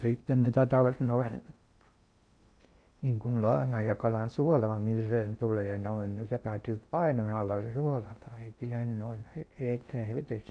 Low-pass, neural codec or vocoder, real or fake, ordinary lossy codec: 9.9 kHz; codec, 16 kHz in and 24 kHz out, 0.6 kbps, FocalCodec, streaming, 2048 codes; fake; none